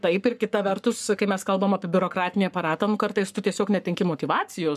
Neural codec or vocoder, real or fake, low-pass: codec, 44.1 kHz, 7.8 kbps, Pupu-Codec; fake; 14.4 kHz